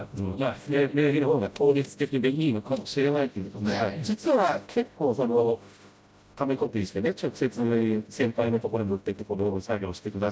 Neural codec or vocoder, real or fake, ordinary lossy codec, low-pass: codec, 16 kHz, 0.5 kbps, FreqCodec, smaller model; fake; none; none